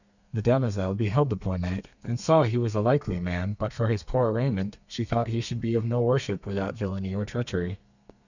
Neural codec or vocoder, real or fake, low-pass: codec, 32 kHz, 1.9 kbps, SNAC; fake; 7.2 kHz